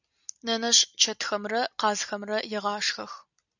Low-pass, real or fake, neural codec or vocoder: 7.2 kHz; real; none